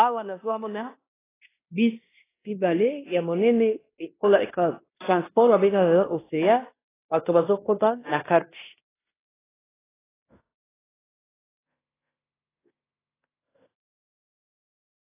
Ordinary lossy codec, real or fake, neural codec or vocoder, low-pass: AAC, 16 kbps; fake; codec, 16 kHz in and 24 kHz out, 0.9 kbps, LongCat-Audio-Codec, fine tuned four codebook decoder; 3.6 kHz